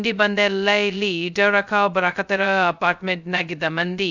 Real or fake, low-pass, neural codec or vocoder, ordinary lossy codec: fake; 7.2 kHz; codec, 16 kHz, 0.2 kbps, FocalCodec; none